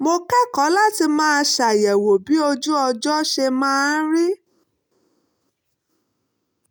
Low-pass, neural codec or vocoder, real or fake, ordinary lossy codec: none; none; real; none